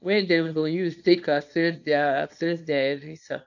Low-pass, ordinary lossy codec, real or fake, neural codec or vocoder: 7.2 kHz; none; fake; codec, 24 kHz, 0.9 kbps, WavTokenizer, small release